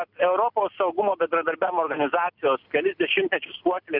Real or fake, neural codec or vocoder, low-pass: real; none; 5.4 kHz